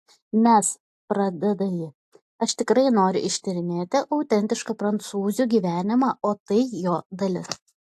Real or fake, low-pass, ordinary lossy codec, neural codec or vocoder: real; 14.4 kHz; AAC, 64 kbps; none